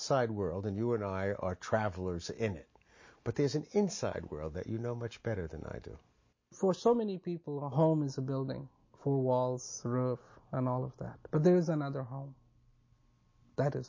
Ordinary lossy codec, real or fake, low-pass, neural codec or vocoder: MP3, 32 kbps; real; 7.2 kHz; none